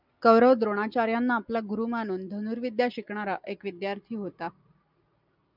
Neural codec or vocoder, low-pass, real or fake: none; 5.4 kHz; real